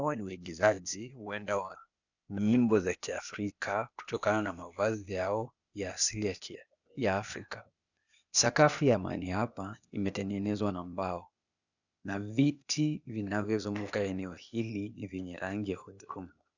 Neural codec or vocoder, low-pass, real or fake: codec, 16 kHz, 0.8 kbps, ZipCodec; 7.2 kHz; fake